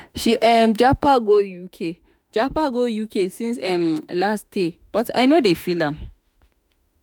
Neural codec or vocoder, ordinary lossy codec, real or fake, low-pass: autoencoder, 48 kHz, 32 numbers a frame, DAC-VAE, trained on Japanese speech; none; fake; none